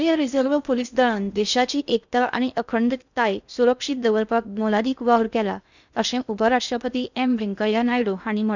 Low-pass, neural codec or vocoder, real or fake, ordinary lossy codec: 7.2 kHz; codec, 16 kHz in and 24 kHz out, 0.6 kbps, FocalCodec, streaming, 2048 codes; fake; none